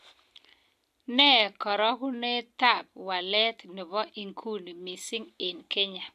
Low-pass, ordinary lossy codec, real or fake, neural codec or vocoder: 14.4 kHz; AAC, 96 kbps; real; none